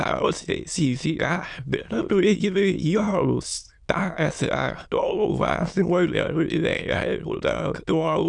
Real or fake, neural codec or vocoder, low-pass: fake; autoencoder, 22.05 kHz, a latent of 192 numbers a frame, VITS, trained on many speakers; 9.9 kHz